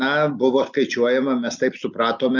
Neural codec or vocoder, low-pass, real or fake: none; 7.2 kHz; real